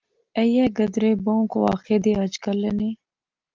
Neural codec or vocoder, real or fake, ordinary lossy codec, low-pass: none; real; Opus, 32 kbps; 7.2 kHz